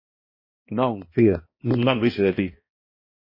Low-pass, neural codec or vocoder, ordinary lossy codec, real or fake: 5.4 kHz; codec, 16 kHz, 1 kbps, X-Codec, HuBERT features, trained on balanced general audio; MP3, 24 kbps; fake